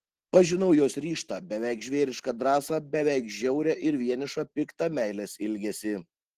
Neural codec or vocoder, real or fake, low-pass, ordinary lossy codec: none; real; 14.4 kHz; Opus, 16 kbps